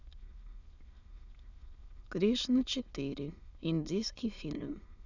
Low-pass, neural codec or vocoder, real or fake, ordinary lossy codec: 7.2 kHz; autoencoder, 22.05 kHz, a latent of 192 numbers a frame, VITS, trained on many speakers; fake; none